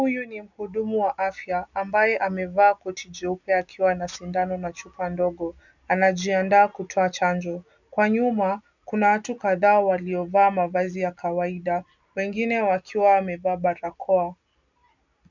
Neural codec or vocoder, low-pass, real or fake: none; 7.2 kHz; real